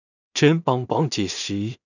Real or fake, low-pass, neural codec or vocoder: fake; 7.2 kHz; codec, 16 kHz in and 24 kHz out, 0.4 kbps, LongCat-Audio-Codec, two codebook decoder